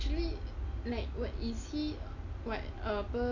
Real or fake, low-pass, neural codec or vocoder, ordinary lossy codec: real; 7.2 kHz; none; none